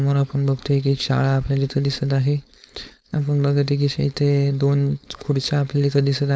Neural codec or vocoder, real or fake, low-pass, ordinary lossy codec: codec, 16 kHz, 4.8 kbps, FACodec; fake; none; none